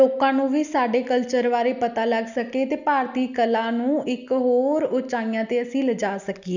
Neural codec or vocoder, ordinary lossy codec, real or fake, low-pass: none; none; real; 7.2 kHz